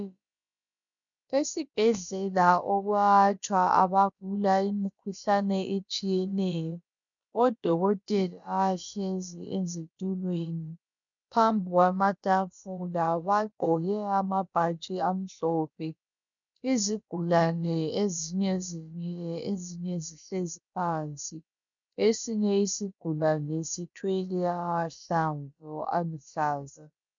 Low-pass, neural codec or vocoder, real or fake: 7.2 kHz; codec, 16 kHz, about 1 kbps, DyCAST, with the encoder's durations; fake